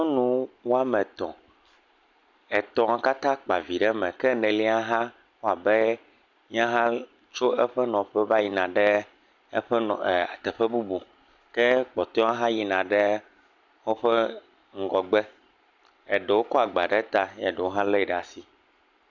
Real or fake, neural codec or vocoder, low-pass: real; none; 7.2 kHz